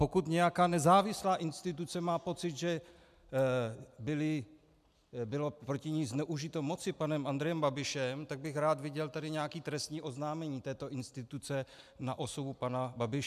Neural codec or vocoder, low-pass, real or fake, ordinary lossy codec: none; 14.4 kHz; real; MP3, 96 kbps